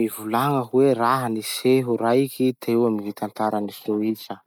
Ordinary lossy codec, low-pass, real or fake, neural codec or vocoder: none; 19.8 kHz; real; none